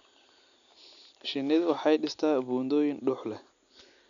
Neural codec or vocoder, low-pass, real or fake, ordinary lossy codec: none; 7.2 kHz; real; none